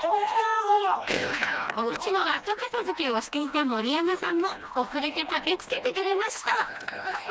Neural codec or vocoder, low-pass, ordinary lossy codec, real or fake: codec, 16 kHz, 1 kbps, FreqCodec, smaller model; none; none; fake